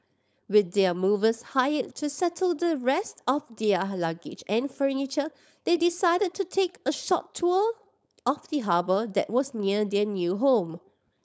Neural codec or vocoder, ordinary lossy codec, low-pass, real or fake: codec, 16 kHz, 4.8 kbps, FACodec; none; none; fake